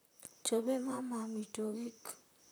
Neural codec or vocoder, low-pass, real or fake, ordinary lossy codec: vocoder, 44.1 kHz, 128 mel bands, Pupu-Vocoder; none; fake; none